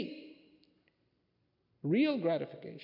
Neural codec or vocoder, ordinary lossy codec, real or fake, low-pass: none; MP3, 48 kbps; real; 5.4 kHz